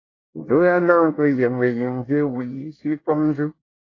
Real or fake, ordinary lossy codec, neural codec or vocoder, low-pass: fake; AAC, 32 kbps; codec, 24 kHz, 1 kbps, SNAC; 7.2 kHz